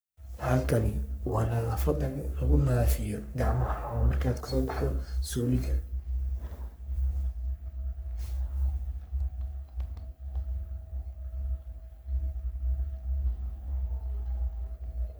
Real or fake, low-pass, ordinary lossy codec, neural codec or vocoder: fake; none; none; codec, 44.1 kHz, 3.4 kbps, Pupu-Codec